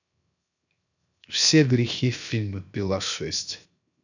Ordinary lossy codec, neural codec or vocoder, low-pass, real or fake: none; codec, 16 kHz, 0.7 kbps, FocalCodec; 7.2 kHz; fake